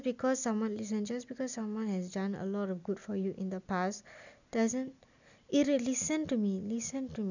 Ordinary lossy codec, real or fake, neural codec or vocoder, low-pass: none; real; none; 7.2 kHz